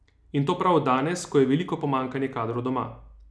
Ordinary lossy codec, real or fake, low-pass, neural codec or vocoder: none; real; none; none